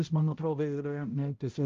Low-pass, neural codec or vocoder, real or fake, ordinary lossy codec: 7.2 kHz; codec, 16 kHz, 0.5 kbps, X-Codec, HuBERT features, trained on balanced general audio; fake; Opus, 32 kbps